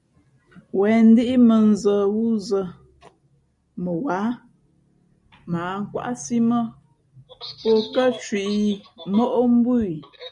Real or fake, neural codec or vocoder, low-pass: real; none; 10.8 kHz